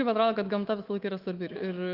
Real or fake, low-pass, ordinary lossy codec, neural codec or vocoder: fake; 5.4 kHz; Opus, 24 kbps; autoencoder, 48 kHz, 128 numbers a frame, DAC-VAE, trained on Japanese speech